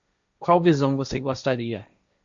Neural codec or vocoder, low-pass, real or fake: codec, 16 kHz, 1.1 kbps, Voila-Tokenizer; 7.2 kHz; fake